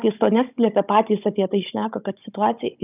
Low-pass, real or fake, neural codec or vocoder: 3.6 kHz; fake; codec, 16 kHz, 16 kbps, FunCodec, trained on Chinese and English, 50 frames a second